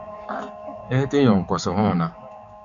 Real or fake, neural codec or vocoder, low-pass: fake; codec, 16 kHz, 4 kbps, X-Codec, HuBERT features, trained on balanced general audio; 7.2 kHz